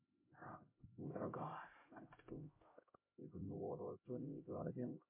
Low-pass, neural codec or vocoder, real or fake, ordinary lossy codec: 3.6 kHz; codec, 16 kHz, 0.5 kbps, X-Codec, HuBERT features, trained on LibriSpeech; fake; MP3, 24 kbps